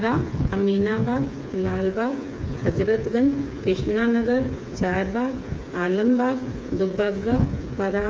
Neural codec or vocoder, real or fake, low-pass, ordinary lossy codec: codec, 16 kHz, 4 kbps, FreqCodec, smaller model; fake; none; none